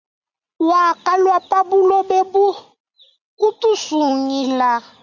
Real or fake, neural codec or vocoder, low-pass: real; none; 7.2 kHz